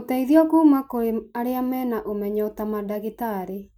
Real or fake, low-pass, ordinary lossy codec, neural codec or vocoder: real; 19.8 kHz; none; none